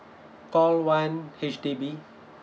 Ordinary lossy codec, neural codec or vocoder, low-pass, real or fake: none; none; none; real